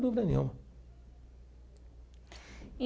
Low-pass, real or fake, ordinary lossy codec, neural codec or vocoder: none; real; none; none